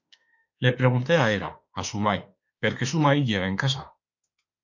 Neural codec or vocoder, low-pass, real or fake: autoencoder, 48 kHz, 32 numbers a frame, DAC-VAE, trained on Japanese speech; 7.2 kHz; fake